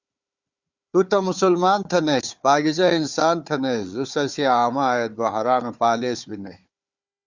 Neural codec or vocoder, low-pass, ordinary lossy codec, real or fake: codec, 16 kHz, 4 kbps, FunCodec, trained on Chinese and English, 50 frames a second; 7.2 kHz; Opus, 64 kbps; fake